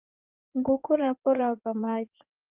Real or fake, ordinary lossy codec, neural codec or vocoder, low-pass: fake; Opus, 24 kbps; codec, 16 kHz in and 24 kHz out, 2.2 kbps, FireRedTTS-2 codec; 3.6 kHz